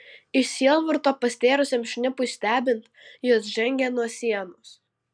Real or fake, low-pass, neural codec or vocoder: real; 9.9 kHz; none